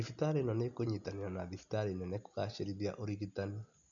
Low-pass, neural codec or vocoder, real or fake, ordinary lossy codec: 7.2 kHz; none; real; none